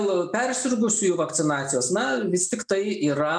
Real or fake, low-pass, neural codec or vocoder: real; 9.9 kHz; none